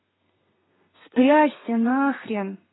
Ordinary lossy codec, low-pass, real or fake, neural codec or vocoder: AAC, 16 kbps; 7.2 kHz; fake; codec, 32 kHz, 1.9 kbps, SNAC